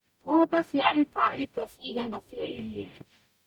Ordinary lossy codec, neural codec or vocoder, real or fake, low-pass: none; codec, 44.1 kHz, 0.9 kbps, DAC; fake; 19.8 kHz